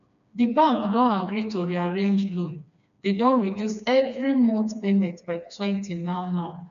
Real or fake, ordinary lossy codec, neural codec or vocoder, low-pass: fake; none; codec, 16 kHz, 2 kbps, FreqCodec, smaller model; 7.2 kHz